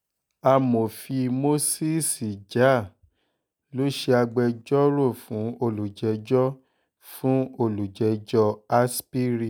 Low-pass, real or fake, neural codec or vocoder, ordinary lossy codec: none; real; none; none